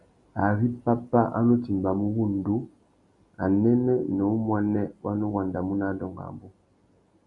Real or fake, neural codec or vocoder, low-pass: real; none; 10.8 kHz